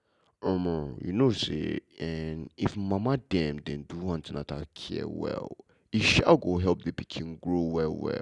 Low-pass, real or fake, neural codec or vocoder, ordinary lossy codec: 10.8 kHz; real; none; Opus, 64 kbps